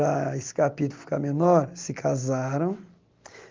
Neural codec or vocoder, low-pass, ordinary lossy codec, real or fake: none; 7.2 kHz; Opus, 24 kbps; real